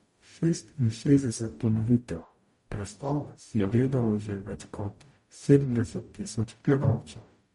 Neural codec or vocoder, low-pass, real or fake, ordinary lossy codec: codec, 44.1 kHz, 0.9 kbps, DAC; 19.8 kHz; fake; MP3, 48 kbps